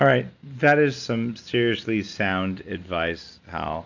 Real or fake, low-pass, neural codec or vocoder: real; 7.2 kHz; none